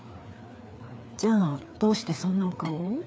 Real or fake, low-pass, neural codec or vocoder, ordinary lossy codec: fake; none; codec, 16 kHz, 4 kbps, FreqCodec, larger model; none